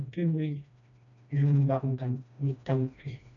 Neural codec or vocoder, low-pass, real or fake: codec, 16 kHz, 1 kbps, FreqCodec, smaller model; 7.2 kHz; fake